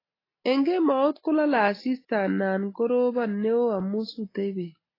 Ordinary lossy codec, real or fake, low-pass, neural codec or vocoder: AAC, 24 kbps; real; 5.4 kHz; none